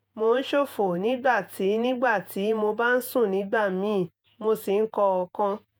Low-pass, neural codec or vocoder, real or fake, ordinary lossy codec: none; vocoder, 48 kHz, 128 mel bands, Vocos; fake; none